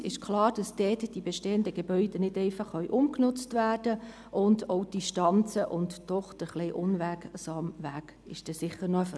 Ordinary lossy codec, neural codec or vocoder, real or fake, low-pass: none; none; real; none